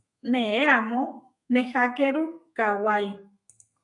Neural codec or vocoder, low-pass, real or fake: codec, 32 kHz, 1.9 kbps, SNAC; 10.8 kHz; fake